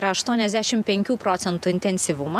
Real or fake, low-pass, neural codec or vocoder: fake; 14.4 kHz; vocoder, 48 kHz, 128 mel bands, Vocos